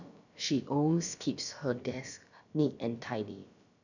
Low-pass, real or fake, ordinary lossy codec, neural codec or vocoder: 7.2 kHz; fake; none; codec, 16 kHz, about 1 kbps, DyCAST, with the encoder's durations